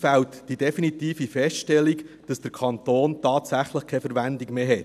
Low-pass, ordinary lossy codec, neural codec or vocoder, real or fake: 14.4 kHz; none; none; real